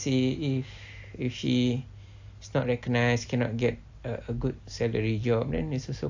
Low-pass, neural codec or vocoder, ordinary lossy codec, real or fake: 7.2 kHz; none; AAC, 48 kbps; real